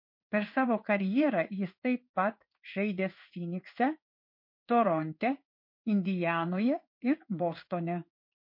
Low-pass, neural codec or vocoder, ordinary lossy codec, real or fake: 5.4 kHz; codec, 16 kHz in and 24 kHz out, 1 kbps, XY-Tokenizer; MP3, 32 kbps; fake